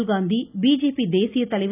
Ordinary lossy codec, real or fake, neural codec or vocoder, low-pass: none; real; none; 3.6 kHz